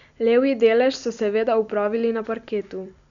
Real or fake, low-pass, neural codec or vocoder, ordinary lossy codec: real; 7.2 kHz; none; none